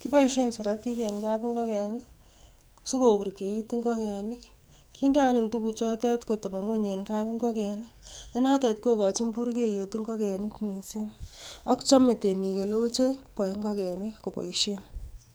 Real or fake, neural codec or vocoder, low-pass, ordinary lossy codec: fake; codec, 44.1 kHz, 2.6 kbps, SNAC; none; none